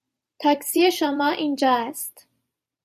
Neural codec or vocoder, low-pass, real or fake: vocoder, 44.1 kHz, 128 mel bands every 256 samples, BigVGAN v2; 14.4 kHz; fake